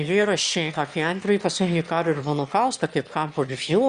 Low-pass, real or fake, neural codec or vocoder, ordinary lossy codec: 9.9 kHz; fake; autoencoder, 22.05 kHz, a latent of 192 numbers a frame, VITS, trained on one speaker; Opus, 64 kbps